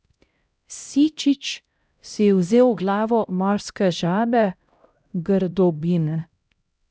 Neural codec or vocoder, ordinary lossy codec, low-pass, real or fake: codec, 16 kHz, 0.5 kbps, X-Codec, HuBERT features, trained on LibriSpeech; none; none; fake